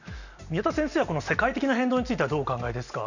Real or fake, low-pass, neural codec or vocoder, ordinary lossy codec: real; 7.2 kHz; none; none